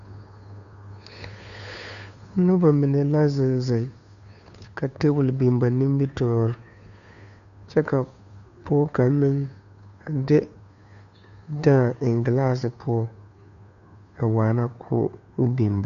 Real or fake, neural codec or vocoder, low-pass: fake; codec, 16 kHz, 2 kbps, FunCodec, trained on Chinese and English, 25 frames a second; 7.2 kHz